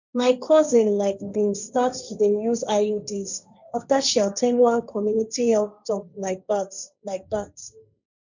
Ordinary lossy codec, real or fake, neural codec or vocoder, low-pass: none; fake; codec, 16 kHz, 1.1 kbps, Voila-Tokenizer; 7.2 kHz